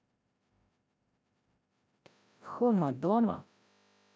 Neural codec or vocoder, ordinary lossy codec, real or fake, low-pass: codec, 16 kHz, 0.5 kbps, FreqCodec, larger model; none; fake; none